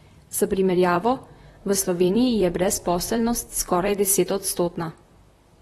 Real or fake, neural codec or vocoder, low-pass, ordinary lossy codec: fake; vocoder, 44.1 kHz, 128 mel bands, Pupu-Vocoder; 19.8 kHz; AAC, 32 kbps